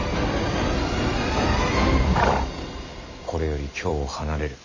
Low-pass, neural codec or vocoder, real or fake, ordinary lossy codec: 7.2 kHz; vocoder, 44.1 kHz, 128 mel bands every 256 samples, BigVGAN v2; fake; none